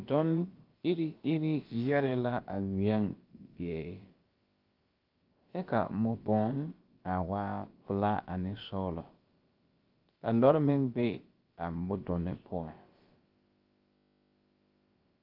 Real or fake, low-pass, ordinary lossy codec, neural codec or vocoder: fake; 5.4 kHz; Opus, 32 kbps; codec, 16 kHz, about 1 kbps, DyCAST, with the encoder's durations